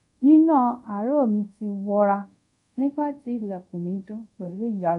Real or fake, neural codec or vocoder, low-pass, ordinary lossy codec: fake; codec, 24 kHz, 0.5 kbps, DualCodec; 10.8 kHz; none